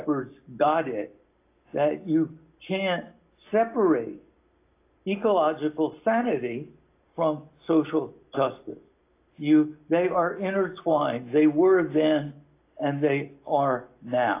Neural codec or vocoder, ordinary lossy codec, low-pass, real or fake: none; AAC, 24 kbps; 3.6 kHz; real